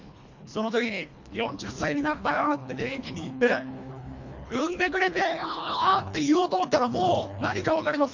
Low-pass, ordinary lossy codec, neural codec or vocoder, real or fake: 7.2 kHz; MP3, 48 kbps; codec, 24 kHz, 1.5 kbps, HILCodec; fake